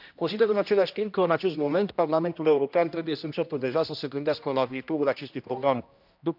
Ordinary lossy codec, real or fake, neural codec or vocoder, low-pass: none; fake; codec, 16 kHz, 1 kbps, X-Codec, HuBERT features, trained on general audio; 5.4 kHz